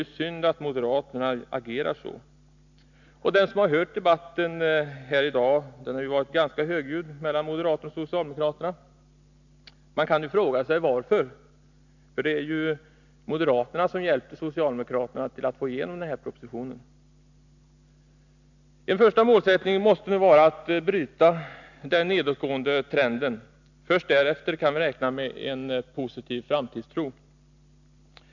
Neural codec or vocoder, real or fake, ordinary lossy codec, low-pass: none; real; none; 7.2 kHz